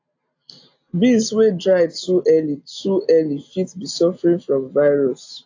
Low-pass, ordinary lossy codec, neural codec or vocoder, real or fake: 7.2 kHz; AAC, 48 kbps; none; real